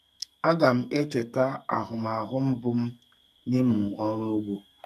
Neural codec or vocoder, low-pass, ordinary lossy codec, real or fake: codec, 44.1 kHz, 2.6 kbps, SNAC; 14.4 kHz; none; fake